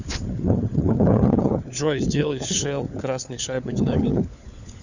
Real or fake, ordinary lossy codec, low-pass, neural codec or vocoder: fake; none; 7.2 kHz; codec, 16 kHz, 4 kbps, FunCodec, trained on LibriTTS, 50 frames a second